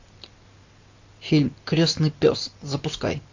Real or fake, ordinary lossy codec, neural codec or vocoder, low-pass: real; AAC, 48 kbps; none; 7.2 kHz